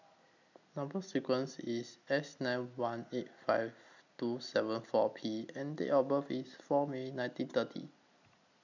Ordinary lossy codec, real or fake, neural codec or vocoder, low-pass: none; real; none; 7.2 kHz